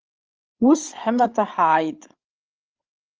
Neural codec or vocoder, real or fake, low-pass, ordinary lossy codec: codec, 16 kHz, 16 kbps, FreqCodec, larger model; fake; 7.2 kHz; Opus, 24 kbps